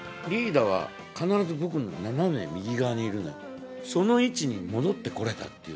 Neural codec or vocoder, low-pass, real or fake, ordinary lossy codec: none; none; real; none